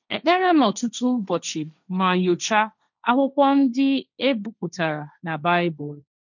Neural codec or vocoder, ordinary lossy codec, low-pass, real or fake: codec, 16 kHz, 1.1 kbps, Voila-Tokenizer; none; 7.2 kHz; fake